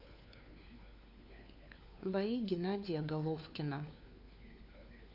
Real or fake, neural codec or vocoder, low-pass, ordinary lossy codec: fake; codec, 16 kHz, 4 kbps, FunCodec, trained on LibriTTS, 50 frames a second; 5.4 kHz; none